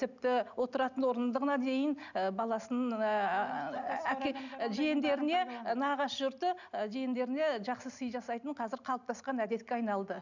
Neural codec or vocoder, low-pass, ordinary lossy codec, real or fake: none; 7.2 kHz; none; real